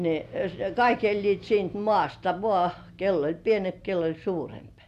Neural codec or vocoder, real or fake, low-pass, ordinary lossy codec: none; real; 14.4 kHz; none